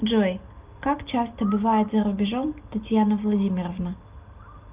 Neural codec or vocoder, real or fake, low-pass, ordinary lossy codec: none; real; 3.6 kHz; Opus, 24 kbps